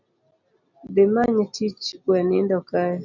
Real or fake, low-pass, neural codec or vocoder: real; 7.2 kHz; none